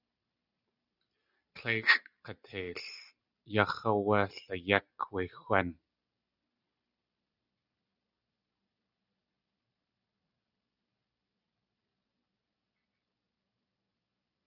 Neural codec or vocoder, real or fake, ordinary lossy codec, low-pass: none; real; Opus, 64 kbps; 5.4 kHz